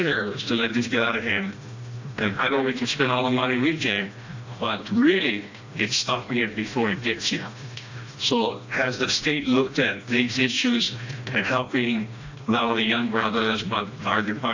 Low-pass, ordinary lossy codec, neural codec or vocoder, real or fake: 7.2 kHz; AAC, 48 kbps; codec, 16 kHz, 1 kbps, FreqCodec, smaller model; fake